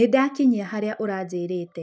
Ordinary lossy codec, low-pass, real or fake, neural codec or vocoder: none; none; real; none